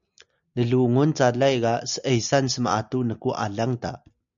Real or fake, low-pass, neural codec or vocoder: real; 7.2 kHz; none